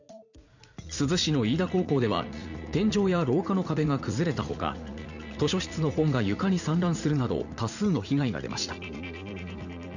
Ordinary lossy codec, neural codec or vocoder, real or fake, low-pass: none; vocoder, 44.1 kHz, 80 mel bands, Vocos; fake; 7.2 kHz